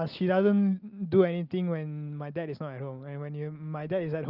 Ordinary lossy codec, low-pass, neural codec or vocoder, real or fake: Opus, 24 kbps; 5.4 kHz; none; real